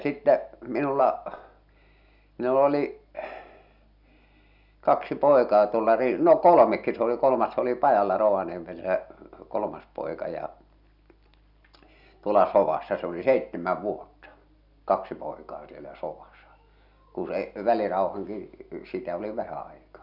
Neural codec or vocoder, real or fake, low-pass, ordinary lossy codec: none; real; 5.4 kHz; none